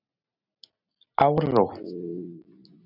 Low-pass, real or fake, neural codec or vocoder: 5.4 kHz; real; none